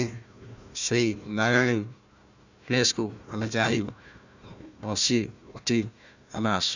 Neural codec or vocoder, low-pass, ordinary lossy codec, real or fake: codec, 16 kHz, 1 kbps, FunCodec, trained on Chinese and English, 50 frames a second; 7.2 kHz; none; fake